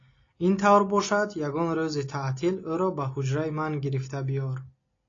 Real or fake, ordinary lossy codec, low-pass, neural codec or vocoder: real; AAC, 32 kbps; 7.2 kHz; none